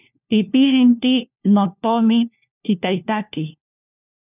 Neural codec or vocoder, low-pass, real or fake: codec, 16 kHz, 1 kbps, FunCodec, trained on LibriTTS, 50 frames a second; 3.6 kHz; fake